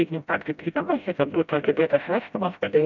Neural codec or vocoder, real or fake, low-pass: codec, 16 kHz, 0.5 kbps, FreqCodec, smaller model; fake; 7.2 kHz